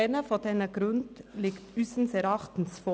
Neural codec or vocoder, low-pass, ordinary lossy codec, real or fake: none; none; none; real